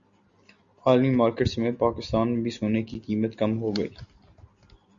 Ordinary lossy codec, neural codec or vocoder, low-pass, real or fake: Opus, 64 kbps; none; 7.2 kHz; real